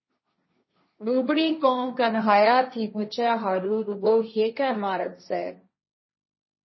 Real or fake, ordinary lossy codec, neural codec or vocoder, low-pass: fake; MP3, 24 kbps; codec, 16 kHz, 1.1 kbps, Voila-Tokenizer; 7.2 kHz